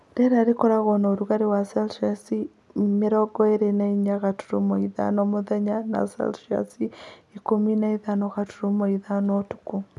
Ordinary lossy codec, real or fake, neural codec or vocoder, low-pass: none; real; none; none